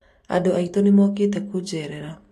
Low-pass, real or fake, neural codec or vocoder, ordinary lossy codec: 14.4 kHz; real; none; AAC, 64 kbps